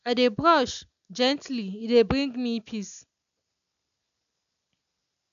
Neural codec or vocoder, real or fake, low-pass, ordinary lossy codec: none; real; 7.2 kHz; none